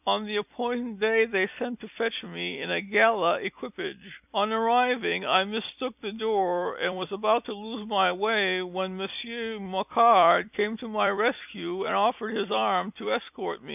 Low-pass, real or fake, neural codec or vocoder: 3.6 kHz; fake; vocoder, 44.1 kHz, 128 mel bands every 256 samples, BigVGAN v2